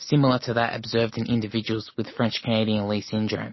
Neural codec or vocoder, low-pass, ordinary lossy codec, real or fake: none; 7.2 kHz; MP3, 24 kbps; real